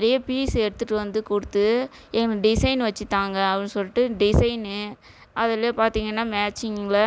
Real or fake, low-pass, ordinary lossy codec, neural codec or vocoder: real; none; none; none